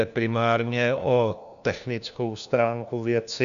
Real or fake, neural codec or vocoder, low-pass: fake; codec, 16 kHz, 1 kbps, FunCodec, trained on LibriTTS, 50 frames a second; 7.2 kHz